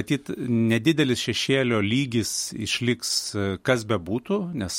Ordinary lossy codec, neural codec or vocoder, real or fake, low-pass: MP3, 64 kbps; none; real; 19.8 kHz